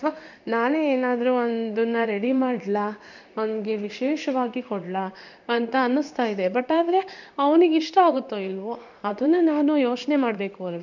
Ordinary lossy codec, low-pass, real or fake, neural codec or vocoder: none; 7.2 kHz; fake; codec, 16 kHz in and 24 kHz out, 1 kbps, XY-Tokenizer